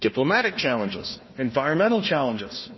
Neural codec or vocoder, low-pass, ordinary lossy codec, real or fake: codec, 16 kHz, 1 kbps, FunCodec, trained on Chinese and English, 50 frames a second; 7.2 kHz; MP3, 24 kbps; fake